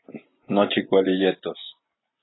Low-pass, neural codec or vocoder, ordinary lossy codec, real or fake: 7.2 kHz; none; AAC, 16 kbps; real